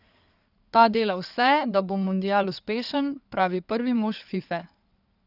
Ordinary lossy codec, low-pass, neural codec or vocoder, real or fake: none; 5.4 kHz; codec, 16 kHz in and 24 kHz out, 2.2 kbps, FireRedTTS-2 codec; fake